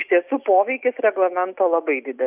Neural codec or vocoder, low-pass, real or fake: none; 3.6 kHz; real